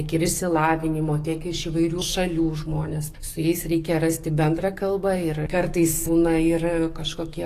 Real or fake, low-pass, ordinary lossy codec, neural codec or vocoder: fake; 14.4 kHz; AAC, 48 kbps; codec, 44.1 kHz, 7.8 kbps, DAC